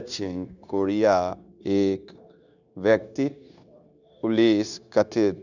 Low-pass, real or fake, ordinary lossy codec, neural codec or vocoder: 7.2 kHz; fake; none; codec, 16 kHz, 0.9 kbps, LongCat-Audio-Codec